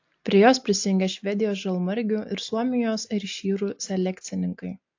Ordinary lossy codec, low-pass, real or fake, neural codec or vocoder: AAC, 48 kbps; 7.2 kHz; real; none